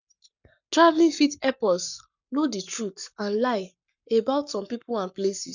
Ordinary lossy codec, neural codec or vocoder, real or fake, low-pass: none; codec, 44.1 kHz, 7.8 kbps, Pupu-Codec; fake; 7.2 kHz